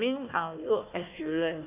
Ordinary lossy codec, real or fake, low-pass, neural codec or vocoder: none; fake; 3.6 kHz; codec, 16 kHz, 1 kbps, FunCodec, trained on Chinese and English, 50 frames a second